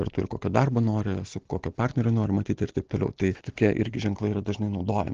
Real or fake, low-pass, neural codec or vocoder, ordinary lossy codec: real; 7.2 kHz; none; Opus, 16 kbps